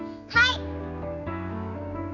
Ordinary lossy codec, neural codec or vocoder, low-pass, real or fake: none; codec, 16 kHz, 4 kbps, X-Codec, HuBERT features, trained on general audio; 7.2 kHz; fake